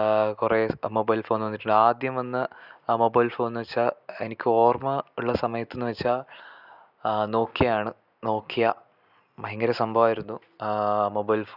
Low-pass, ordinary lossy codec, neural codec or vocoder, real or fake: 5.4 kHz; none; none; real